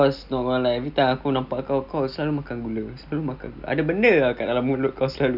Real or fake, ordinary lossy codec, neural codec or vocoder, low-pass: real; none; none; 5.4 kHz